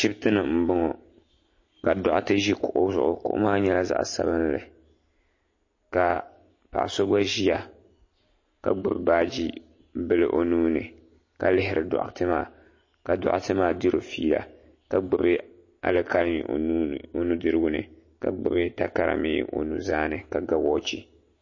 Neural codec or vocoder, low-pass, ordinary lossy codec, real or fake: none; 7.2 kHz; MP3, 32 kbps; real